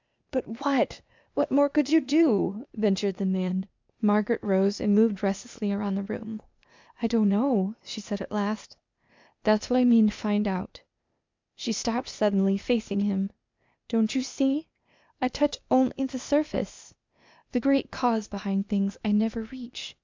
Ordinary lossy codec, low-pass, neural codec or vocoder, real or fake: MP3, 64 kbps; 7.2 kHz; codec, 16 kHz, 0.8 kbps, ZipCodec; fake